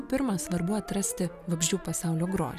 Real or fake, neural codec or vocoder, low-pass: real; none; 14.4 kHz